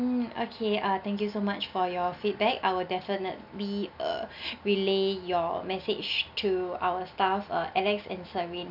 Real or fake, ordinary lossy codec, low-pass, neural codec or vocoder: real; none; 5.4 kHz; none